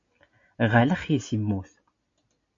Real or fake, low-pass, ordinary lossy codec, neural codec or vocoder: real; 7.2 kHz; AAC, 48 kbps; none